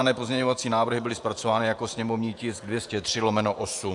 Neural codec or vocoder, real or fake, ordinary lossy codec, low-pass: vocoder, 48 kHz, 128 mel bands, Vocos; fake; AAC, 64 kbps; 10.8 kHz